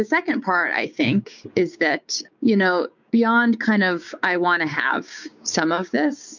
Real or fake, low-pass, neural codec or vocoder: fake; 7.2 kHz; codec, 16 kHz, 6 kbps, DAC